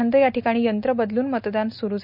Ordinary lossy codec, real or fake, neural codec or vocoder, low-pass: none; real; none; 5.4 kHz